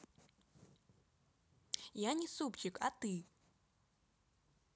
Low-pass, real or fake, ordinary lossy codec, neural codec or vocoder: none; real; none; none